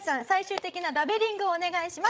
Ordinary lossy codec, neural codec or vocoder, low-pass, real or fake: none; codec, 16 kHz, 16 kbps, FreqCodec, larger model; none; fake